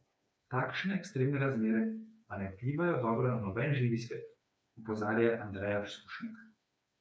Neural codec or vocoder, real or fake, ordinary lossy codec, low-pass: codec, 16 kHz, 4 kbps, FreqCodec, smaller model; fake; none; none